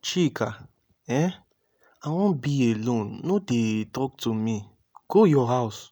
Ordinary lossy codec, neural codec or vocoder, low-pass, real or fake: none; none; none; real